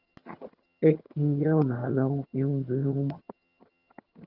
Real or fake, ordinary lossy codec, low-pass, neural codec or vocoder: fake; Opus, 24 kbps; 5.4 kHz; vocoder, 22.05 kHz, 80 mel bands, HiFi-GAN